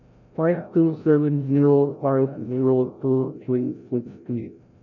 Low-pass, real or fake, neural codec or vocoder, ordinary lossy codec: 7.2 kHz; fake; codec, 16 kHz, 0.5 kbps, FreqCodec, larger model; none